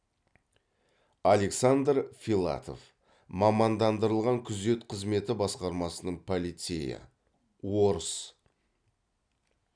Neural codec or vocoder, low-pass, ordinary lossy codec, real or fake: none; 9.9 kHz; none; real